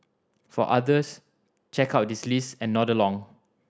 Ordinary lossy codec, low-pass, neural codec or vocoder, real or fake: none; none; none; real